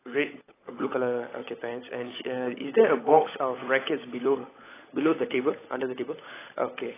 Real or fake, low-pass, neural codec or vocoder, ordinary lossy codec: fake; 3.6 kHz; codec, 16 kHz, 16 kbps, FunCodec, trained on LibriTTS, 50 frames a second; AAC, 16 kbps